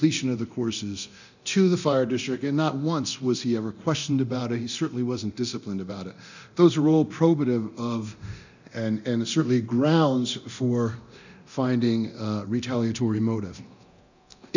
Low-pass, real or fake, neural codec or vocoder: 7.2 kHz; fake; codec, 24 kHz, 0.9 kbps, DualCodec